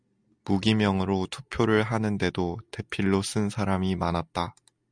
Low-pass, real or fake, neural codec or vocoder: 9.9 kHz; real; none